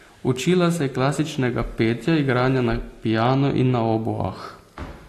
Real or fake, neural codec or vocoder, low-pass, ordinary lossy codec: real; none; 14.4 kHz; AAC, 48 kbps